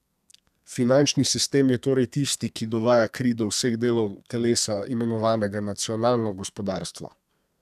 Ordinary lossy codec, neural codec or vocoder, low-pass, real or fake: none; codec, 32 kHz, 1.9 kbps, SNAC; 14.4 kHz; fake